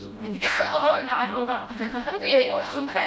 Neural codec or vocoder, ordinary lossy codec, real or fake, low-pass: codec, 16 kHz, 0.5 kbps, FreqCodec, smaller model; none; fake; none